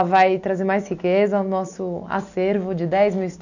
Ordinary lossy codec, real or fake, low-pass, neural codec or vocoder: none; real; 7.2 kHz; none